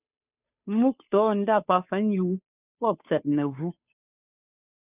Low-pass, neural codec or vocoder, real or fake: 3.6 kHz; codec, 16 kHz, 2 kbps, FunCodec, trained on Chinese and English, 25 frames a second; fake